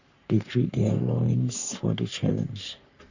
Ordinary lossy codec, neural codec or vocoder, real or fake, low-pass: Opus, 64 kbps; codec, 44.1 kHz, 3.4 kbps, Pupu-Codec; fake; 7.2 kHz